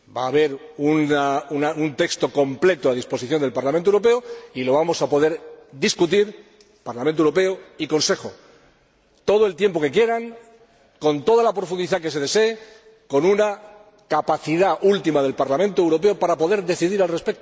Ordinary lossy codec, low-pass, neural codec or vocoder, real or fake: none; none; none; real